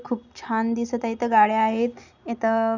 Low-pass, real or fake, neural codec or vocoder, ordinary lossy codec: 7.2 kHz; real; none; none